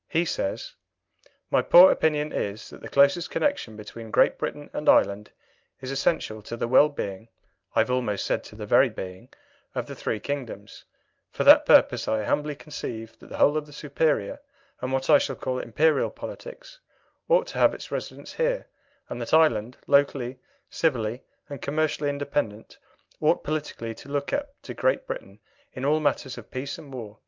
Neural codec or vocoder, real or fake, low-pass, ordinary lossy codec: none; real; 7.2 kHz; Opus, 24 kbps